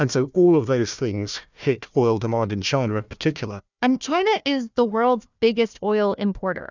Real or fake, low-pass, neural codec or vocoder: fake; 7.2 kHz; codec, 16 kHz, 1 kbps, FunCodec, trained on Chinese and English, 50 frames a second